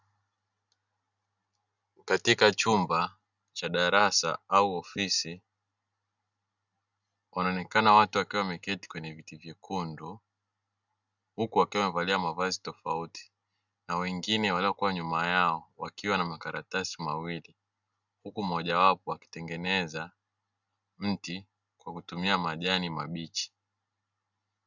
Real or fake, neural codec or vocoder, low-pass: real; none; 7.2 kHz